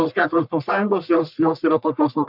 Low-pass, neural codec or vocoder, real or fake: 5.4 kHz; codec, 44.1 kHz, 1.7 kbps, Pupu-Codec; fake